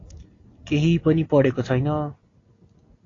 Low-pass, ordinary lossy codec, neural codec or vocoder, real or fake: 7.2 kHz; AAC, 32 kbps; none; real